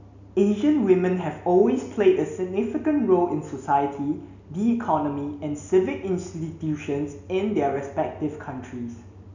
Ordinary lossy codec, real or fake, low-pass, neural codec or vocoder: none; real; 7.2 kHz; none